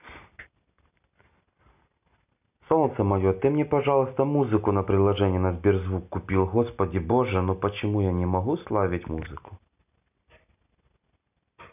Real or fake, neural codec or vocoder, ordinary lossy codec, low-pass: real; none; none; 3.6 kHz